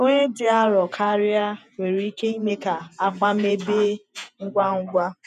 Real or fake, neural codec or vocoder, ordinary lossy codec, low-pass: fake; vocoder, 48 kHz, 128 mel bands, Vocos; none; 14.4 kHz